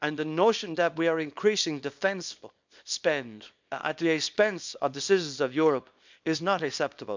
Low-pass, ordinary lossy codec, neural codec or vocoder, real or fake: 7.2 kHz; MP3, 64 kbps; codec, 24 kHz, 0.9 kbps, WavTokenizer, small release; fake